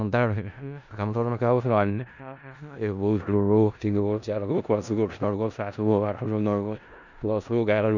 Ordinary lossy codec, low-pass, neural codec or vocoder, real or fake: none; 7.2 kHz; codec, 16 kHz in and 24 kHz out, 0.4 kbps, LongCat-Audio-Codec, four codebook decoder; fake